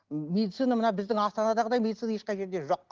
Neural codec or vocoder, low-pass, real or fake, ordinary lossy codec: none; 7.2 kHz; real; Opus, 16 kbps